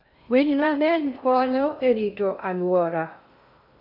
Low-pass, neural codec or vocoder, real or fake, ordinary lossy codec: 5.4 kHz; codec, 16 kHz in and 24 kHz out, 0.6 kbps, FocalCodec, streaming, 4096 codes; fake; none